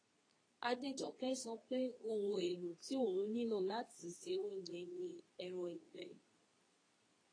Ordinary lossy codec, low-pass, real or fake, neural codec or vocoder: AAC, 32 kbps; 9.9 kHz; fake; codec, 24 kHz, 0.9 kbps, WavTokenizer, medium speech release version 2